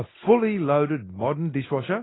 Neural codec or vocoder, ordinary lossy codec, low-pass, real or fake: none; AAC, 16 kbps; 7.2 kHz; real